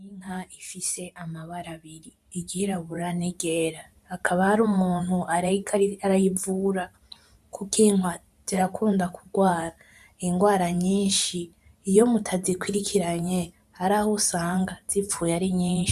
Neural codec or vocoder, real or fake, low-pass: vocoder, 44.1 kHz, 128 mel bands every 512 samples, BigVGAN v2; fake; 14.4 kHz